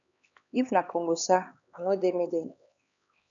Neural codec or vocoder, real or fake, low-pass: codec, 16 kHz, 2 kbps, X-Codec, HuBERT features, trained on LibriSpeech; fake; 7.2 kHz